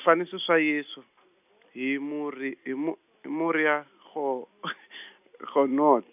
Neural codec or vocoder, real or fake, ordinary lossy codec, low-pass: none; real; none; 3.6 kHz